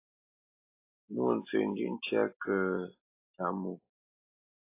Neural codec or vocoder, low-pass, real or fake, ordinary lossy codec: none; 3.6 kHz; real; AAC, 24 kbps